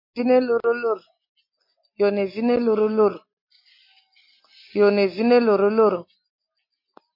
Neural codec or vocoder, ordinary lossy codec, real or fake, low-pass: none; MP3, 32 kbps; real; 5.4 kHz